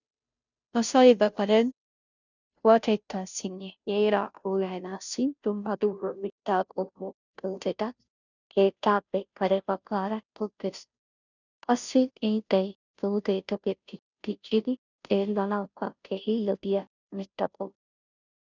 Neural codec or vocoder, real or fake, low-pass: codec, 16 kHz, 0.5 kbps, FunCodec, trained on Chinese and English, 25 frames a second; fake; 7.2 kHz